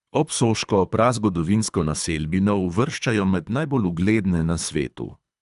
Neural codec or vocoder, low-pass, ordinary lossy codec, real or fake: codec, 24 kHz, 3 kbps, HILCodec; 10.8 kHz; none; fake